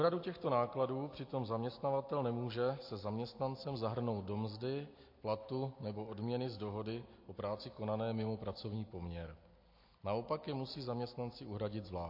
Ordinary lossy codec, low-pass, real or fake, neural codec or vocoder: MP3, 32 kbps; 5.4 kHz; real; none